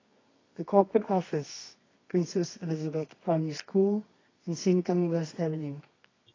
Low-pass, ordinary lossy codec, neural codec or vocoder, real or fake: 7.2 kHz; AAC, 32 kbps; codec, 24 kHz, 0.9 kbps, WavTokenizer, medium music audio release; fake